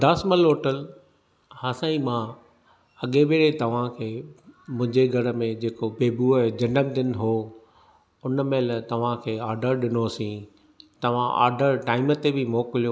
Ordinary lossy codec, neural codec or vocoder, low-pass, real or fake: none; none; none; real